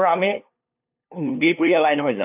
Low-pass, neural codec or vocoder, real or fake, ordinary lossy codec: 3.6 kHz; codec, 16 kHz, 2 kbps, FunCodec, trained on LibriTTS, 25 frames a second; fake; none